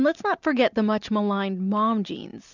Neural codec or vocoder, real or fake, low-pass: none; real; 7.2 kHz